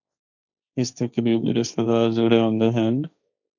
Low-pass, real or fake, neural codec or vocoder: 7.2 kHz; fake; codec, 16 kHz, 1.1 kbps, Voila-Tokenizer